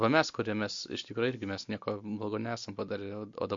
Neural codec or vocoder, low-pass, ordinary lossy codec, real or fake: none; 7.2 kHz; MP3, 48 kbps; real